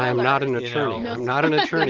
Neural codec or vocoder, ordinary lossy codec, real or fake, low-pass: none; Opus, 16 kbps; real; 7.2 kHz